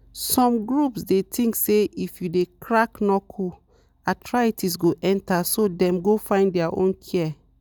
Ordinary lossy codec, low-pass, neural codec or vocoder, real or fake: none; none; none; real